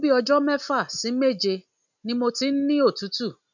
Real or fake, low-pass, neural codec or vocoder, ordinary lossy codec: real; 7.2 kHz; none; none